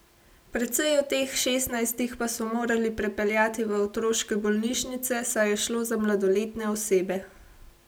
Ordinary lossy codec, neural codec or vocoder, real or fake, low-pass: none; none; real; none